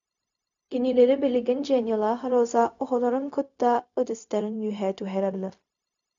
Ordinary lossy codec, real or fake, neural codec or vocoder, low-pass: MP3, 64 kbps; fake; codec, 16 kHz, 0.4 kbps, LongCat-Audio-Codec; 7.2 kHz